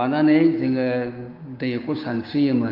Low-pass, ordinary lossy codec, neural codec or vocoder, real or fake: 5.4 kHz; Opus, 32 kbps; codec, 16 kHz, 6 kbps, DAC; fake